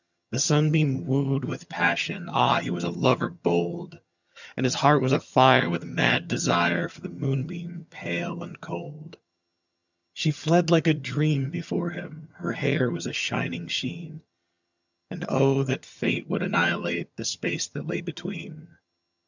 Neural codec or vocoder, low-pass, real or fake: vocoder, 22.05 kHz, 80 mel bands, HiFi-GAN; 7.2 kHz; fake